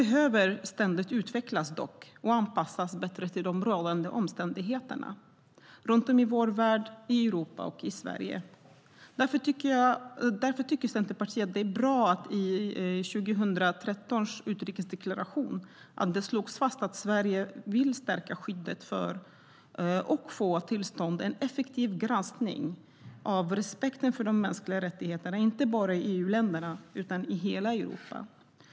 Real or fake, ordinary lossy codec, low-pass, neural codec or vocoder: real; none; none; none